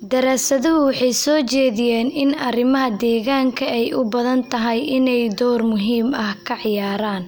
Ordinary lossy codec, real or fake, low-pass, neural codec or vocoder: none; real; none; none